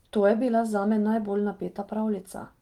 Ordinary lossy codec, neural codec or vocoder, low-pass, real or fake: Opus, 24 kbps; none; 19.8 kHz; real